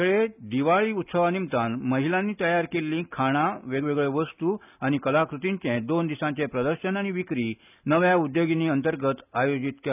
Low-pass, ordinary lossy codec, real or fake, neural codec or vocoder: 3.6 kHz; none; real; none